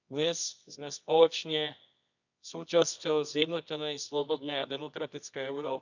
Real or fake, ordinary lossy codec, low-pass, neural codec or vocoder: fake; none; 7.2 kHz; codec, 24 kHz, 0.9 kbps, WavTokenizer, medium music audio release